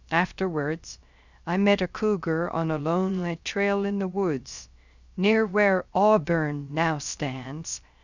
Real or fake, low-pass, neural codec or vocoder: fake; 7.2 kHz; codec, 16 kHz, 0.3 kbps, FocalCodec